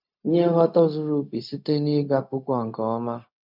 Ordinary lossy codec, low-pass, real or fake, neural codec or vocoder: none; 5.4 kHz; fake; codec, 16 kHz, 0.4 kbps, LongCat-Audio-Codec